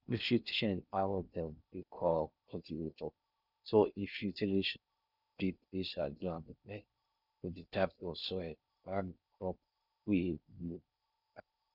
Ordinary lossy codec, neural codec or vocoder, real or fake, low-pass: none; codec, 16 kHz in and 24 kHz out, 0.6 kbps, FocalCodec, streaming, 4096 codes; fake; 5.4 kHz